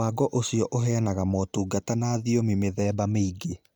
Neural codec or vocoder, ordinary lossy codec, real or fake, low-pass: none; none; real; none